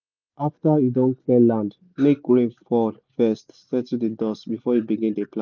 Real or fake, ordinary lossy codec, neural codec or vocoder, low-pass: real; none; none; 7.2 kHz